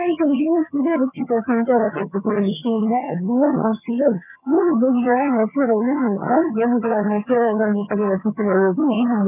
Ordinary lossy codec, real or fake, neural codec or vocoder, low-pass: none; fake; vocoder, 22.05 kHz, 80 mel bands, HiFi-GAN; 3.6 kHz